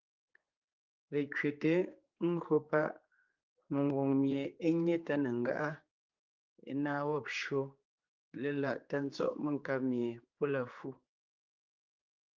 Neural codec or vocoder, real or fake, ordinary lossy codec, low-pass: codec, 16 kHz, 4 kbps, X-Codec, HuBERT features, trained on general audio; fake; Opus, 32 kbps; 7.2 kHz